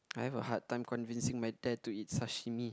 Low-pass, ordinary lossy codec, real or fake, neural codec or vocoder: none; none; real; none